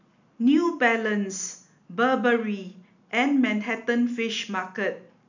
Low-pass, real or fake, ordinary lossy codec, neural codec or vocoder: 7.2 kHz; real; none; none